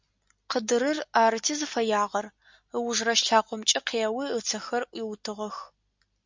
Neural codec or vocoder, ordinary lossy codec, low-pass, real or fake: none; MP3, 64 kbps; 7.2 kHz; real